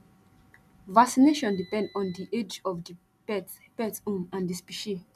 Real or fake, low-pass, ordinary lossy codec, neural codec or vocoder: real; 14.4 kHz; none; none